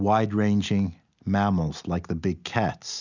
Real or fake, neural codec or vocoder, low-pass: real; none; 7.2 kHz